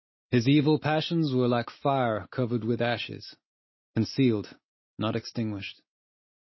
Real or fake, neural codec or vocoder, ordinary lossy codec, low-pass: real; none; MP3, 24 kbps; 7.2 kHz